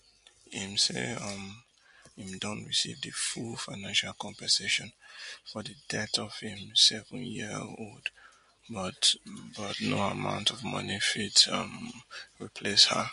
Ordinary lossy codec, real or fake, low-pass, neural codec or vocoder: MP3, 48 kbps; real; 10.8 kHz; none